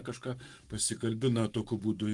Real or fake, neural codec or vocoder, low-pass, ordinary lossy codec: fake; codec, 44.1 kHz, 7.8 kbps, Pupu-Codec; 10.8 kHz; Opus, 32 kbps